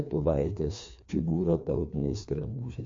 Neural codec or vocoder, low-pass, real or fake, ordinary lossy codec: codec, 16 kHz, 2 kbps, FreqCodec, larger model; 7.2 kHz; fake; MP3, 48 kbps